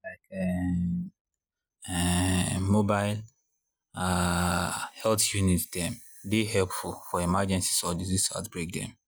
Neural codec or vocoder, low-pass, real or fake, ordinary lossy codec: none; none; real; none